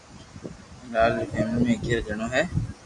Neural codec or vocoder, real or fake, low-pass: none; real; 10.8 kHz